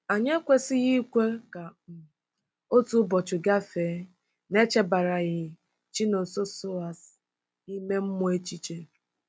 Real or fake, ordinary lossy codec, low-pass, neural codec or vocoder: real; none; none; none